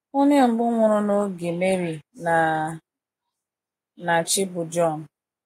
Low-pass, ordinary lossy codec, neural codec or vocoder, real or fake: 19.8 kHz; AAC, 48 kbps; none; real